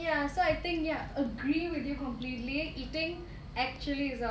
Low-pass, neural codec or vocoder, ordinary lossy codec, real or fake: none; none; none; real